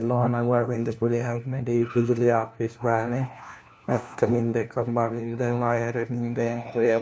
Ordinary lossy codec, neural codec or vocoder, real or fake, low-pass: none; codec, 16 kHz, 1 kbps, FunCodec, trained on LibriTTS, 50 frames a second; fake; none